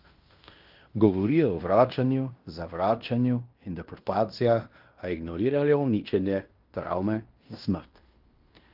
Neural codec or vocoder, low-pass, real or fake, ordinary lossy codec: codec, 16 kHz in and 24 kHz out, 0.9 kbps, LongCat-Audio-Codec, fine tuned four codebook decoder; 5.4 kHz; fake; Opus, 24 kbps